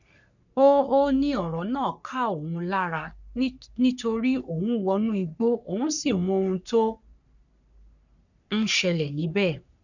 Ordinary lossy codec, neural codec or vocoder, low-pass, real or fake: none; codec, 44.1 kHz, 3.4 kbps, Pupu-Codec; 7.2 kHz; fake